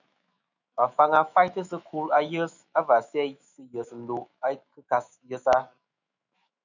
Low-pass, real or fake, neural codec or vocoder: 7.2 kHz; fake; autoencoder, 48 kHz, 128 numbers a frame, DAC-VAE, trained on Japanese speech